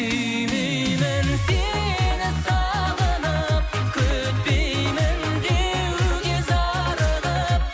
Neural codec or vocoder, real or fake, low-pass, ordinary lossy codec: none; real; none; none